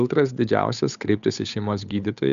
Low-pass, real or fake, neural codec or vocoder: 7.2 kHz; fake; codec, 16 kHz, 8 kbps, FunCodec, trained on Chinese and English, 25 frames a second